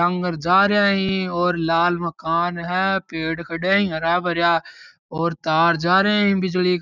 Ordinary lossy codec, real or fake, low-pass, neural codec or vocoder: none; real; 7.2 kHz; none